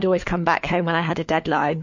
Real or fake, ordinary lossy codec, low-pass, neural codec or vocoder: fake; MP3, 48 kbps; 7.2 kHz; codec, 16 kHz, 4 kbps, FunCodec, trained on LibriTTS, 50 frames a second